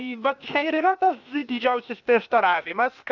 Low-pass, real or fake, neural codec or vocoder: 7.2 kHz; fake; codec, 16 kHz, 0.8 kbps, ZipCodec